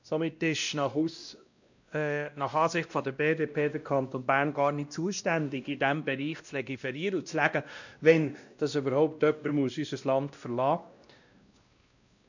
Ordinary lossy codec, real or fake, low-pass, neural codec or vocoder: none; fake; 7.2 kHz; codec, 16 kHz, 1 kbps, X-Codec, WavLM features, trained on Multilingual LibriSpeech